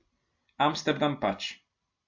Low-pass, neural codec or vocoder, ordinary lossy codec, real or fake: 7.2 kHz; none; MP3, 48 kbps; real